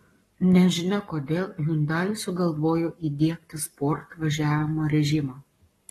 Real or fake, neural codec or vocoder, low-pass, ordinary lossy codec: fake; codec, 44.1 kHz, 7.8 kbps, Pupu-Codec; 19.8 kHz; AAC, 32 kbps